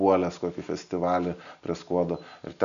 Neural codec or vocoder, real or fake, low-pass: none; real; 7.2 kHz